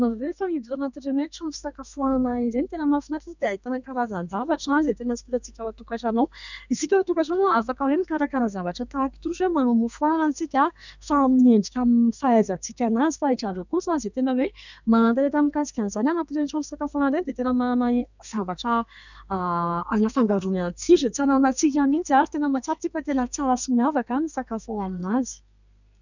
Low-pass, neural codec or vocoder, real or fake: 7.2 kHz; codec, 32 kHz, 1.9 kbps, SNAC; fake